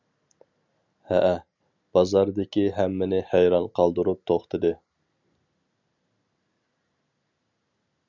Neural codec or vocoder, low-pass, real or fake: none; 7.2 kHz; real